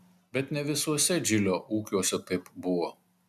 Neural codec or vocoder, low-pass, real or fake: none; 14.4 kHz; real